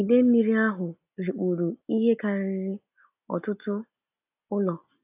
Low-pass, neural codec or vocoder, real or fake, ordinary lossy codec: 3.6 kHz; none; real; AAC, 32 kbps